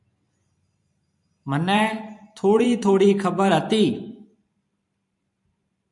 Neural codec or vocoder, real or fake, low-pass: vocoder, 44.1 kHz, 128 mel bands every 512 samples, BigVGAN v2; fake; 10.8 kHz